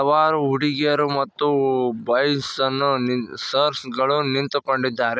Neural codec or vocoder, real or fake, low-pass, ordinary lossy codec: none; real; none; none